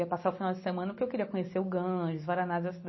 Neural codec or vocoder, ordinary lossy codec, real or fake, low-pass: none; MP3, 24 kbps; real; 7.2 kHz